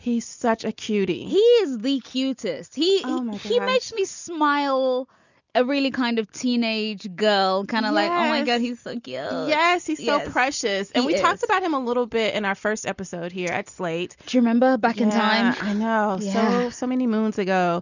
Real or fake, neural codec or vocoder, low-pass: real; none; 7.2 kHz